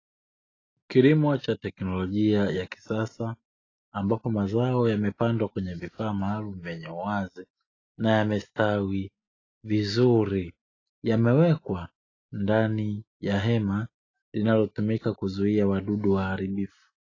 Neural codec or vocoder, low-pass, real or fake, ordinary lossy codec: none; 7.2 kHz; real; AAC, 32 kbps